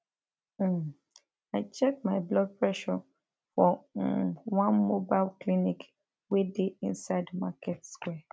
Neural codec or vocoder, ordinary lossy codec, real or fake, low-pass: none; none; real; none